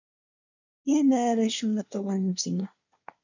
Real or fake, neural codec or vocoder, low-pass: fake; codec, 24 kHz, 1 kbps, SNAC; 7.2 kHz